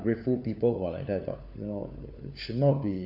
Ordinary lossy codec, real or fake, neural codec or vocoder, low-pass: none; fake; codec, 16 kHz, 4 kbps, FunCodec, trained on LibriTTS, 50 frames a second; 5.4 kHz